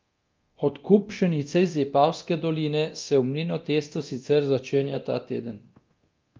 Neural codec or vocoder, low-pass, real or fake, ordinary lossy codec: codec, 24 kHz, 0.9 kbps, DualCodec; 7.2 kHz; fake; Opus, 24 kbps